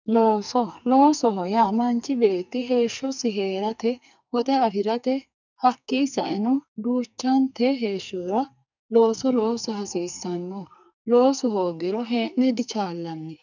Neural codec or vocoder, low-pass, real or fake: codec, 44.1 kHz, 2.6 kbps, SNAC; 7.2 kHz; fake